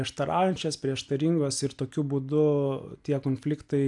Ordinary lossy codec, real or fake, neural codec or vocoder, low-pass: AAC, 64 kbps; real; none; 10.8 kHz